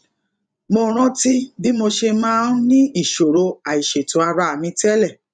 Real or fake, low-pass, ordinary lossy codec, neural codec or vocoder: fake; 9.9 kHz; none; vocoder, 24 kHz, 100 mel bands, Vocos